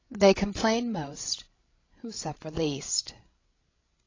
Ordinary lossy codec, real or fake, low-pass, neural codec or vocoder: AAC, 32 kbps; fake; 7.2 kHz; codec, 16 kHz, 16 kbps, FreqCodec, larger model